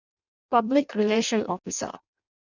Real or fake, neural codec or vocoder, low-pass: fake; codec, 16 kHz in and 24 kHz out, 0.6 kbps, FireRedTTS-2 codec; 7.2 kHz